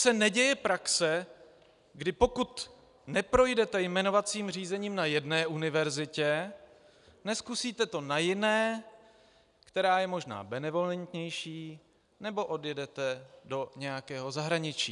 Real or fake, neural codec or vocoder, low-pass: real; none; 10.8 kHz